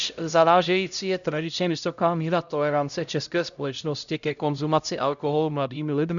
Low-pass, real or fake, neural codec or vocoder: 7.2 kHz; fake; codec, 16 kHz, 0.5 kbps, X-Codec, HuBERT features, trained on LibriSpeech